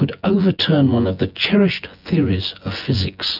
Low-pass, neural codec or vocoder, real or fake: 5.4 kHz; vocoder, 24 kHz, 100 mel bands, Vocos; fake